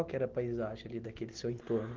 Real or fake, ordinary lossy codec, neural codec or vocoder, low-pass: real; Opus, 16 kbps; none; 7.2 kHz